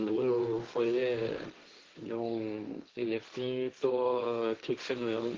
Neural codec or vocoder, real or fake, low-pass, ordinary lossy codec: codec, 16 kHz, 1.1 kbps, Voila-Tokenizer; fake; 7.2 kHz; Opus, 16 kbps